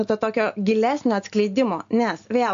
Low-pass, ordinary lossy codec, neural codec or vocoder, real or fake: 7.2 kHz; AAC, 64 kbps; none; real